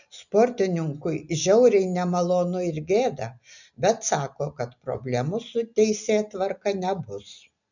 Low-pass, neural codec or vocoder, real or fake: 7.2 kHz; none; real